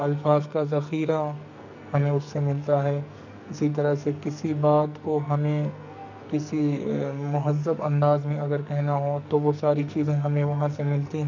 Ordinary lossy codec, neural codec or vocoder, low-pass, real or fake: none; codec, 44.1 kHz, 2.6 kbps, SNAC; 7.2 kHz; fake